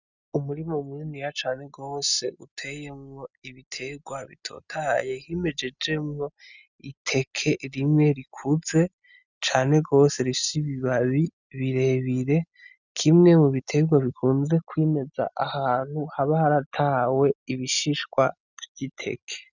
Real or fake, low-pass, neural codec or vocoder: real; 7.2 kHz; none